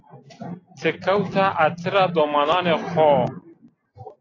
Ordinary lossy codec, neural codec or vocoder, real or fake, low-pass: AAC, 32 kbps; none; real; 7.2 kHz